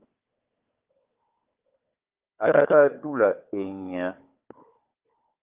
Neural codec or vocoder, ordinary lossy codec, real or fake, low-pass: codec, 16 kHz, 4 kbps, FunCodec, trained on Chinese and English, 50 frames a second; Opus, 32 kbps; fake; 3.6 kHz